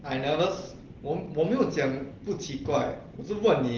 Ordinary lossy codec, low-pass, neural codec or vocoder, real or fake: Opus, 16 kbps; 7.2 kHz; none; real